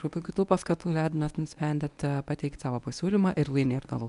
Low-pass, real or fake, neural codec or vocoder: 10.8 kHz; fake; codec, 24 kHz, 0.9 kbps, WavTokenizer, medium speech release version 1